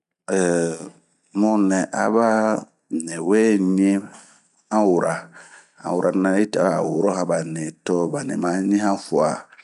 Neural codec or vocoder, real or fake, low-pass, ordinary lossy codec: none; real; 10.8 kHz; none